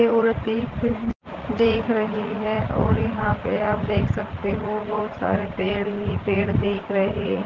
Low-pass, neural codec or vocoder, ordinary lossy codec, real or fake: 7.2 kHz; vocoder, 44.1 kHz, 80 mel bands, Vocos; Opus, 16 kbps; fake